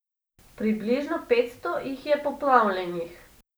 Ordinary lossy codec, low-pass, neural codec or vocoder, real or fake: none; none; none; real